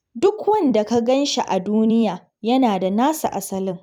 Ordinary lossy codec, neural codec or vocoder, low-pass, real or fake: none; vocoder, 44.1 kHz, 128 mel bands every 256 samples, BigVGAN v2; 19.8 kHz; fake